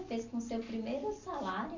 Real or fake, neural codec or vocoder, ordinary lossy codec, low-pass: real; none; none; 7.2 kHz